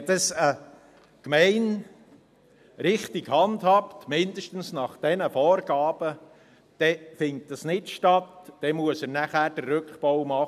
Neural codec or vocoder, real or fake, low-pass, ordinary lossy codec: none; real; 14.4 kHz; MP3, 96 kbps